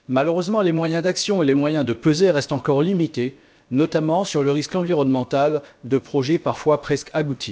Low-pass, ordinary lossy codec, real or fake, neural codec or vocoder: none; none; fake; codec, 16 kHz, about 1 kbps, DyCAST, with the encoder's durations